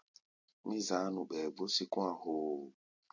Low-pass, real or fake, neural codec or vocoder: 7.2 kHz; real; none